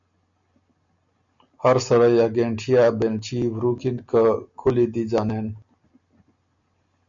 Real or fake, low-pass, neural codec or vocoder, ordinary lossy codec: real; 7.2 kHz; none; MP3, 64 kbps